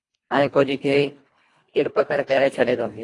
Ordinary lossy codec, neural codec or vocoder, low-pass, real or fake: AAC, 48 kbps; codec, 24 kHz, 1.5 kbps, HILCodec; 10.8 kHz; fake